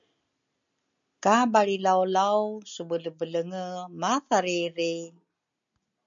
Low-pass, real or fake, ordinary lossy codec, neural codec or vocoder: 7.2 kHz; real; MP3, 64 kbps; none